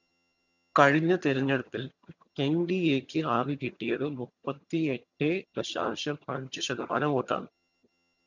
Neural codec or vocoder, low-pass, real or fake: vocoder, 22.05 kHz, 80 mel bands, HiFi-GAN; 7.2 kHz; fake